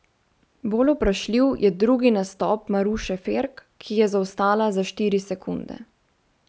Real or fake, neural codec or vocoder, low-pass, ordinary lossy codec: real; none; none; none